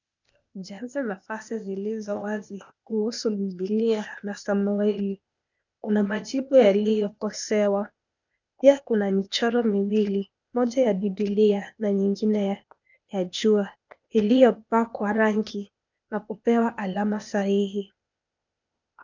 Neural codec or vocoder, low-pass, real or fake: codec, 16 kHz, 0.8 kbps, ZipCodec; 7.2 kHz; fake